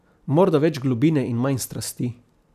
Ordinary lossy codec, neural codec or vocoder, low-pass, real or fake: none; none; 14.4 kHz; real